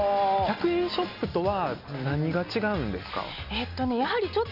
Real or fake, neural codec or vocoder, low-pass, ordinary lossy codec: real; none; 5.4 kHz; none